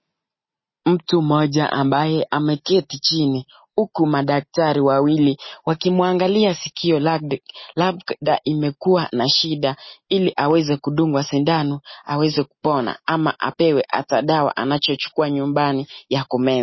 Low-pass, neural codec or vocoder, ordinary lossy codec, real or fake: 7.2 kHz; none; MP3, 24 kbps; real